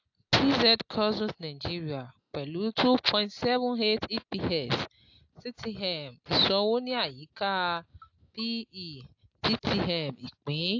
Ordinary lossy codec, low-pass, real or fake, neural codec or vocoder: AAC, 48 kbps; 7.2 kHz; real; none